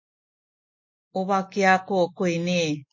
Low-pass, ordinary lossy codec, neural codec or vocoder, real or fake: 7.2 kHz; MP3, 32 kbps; none; real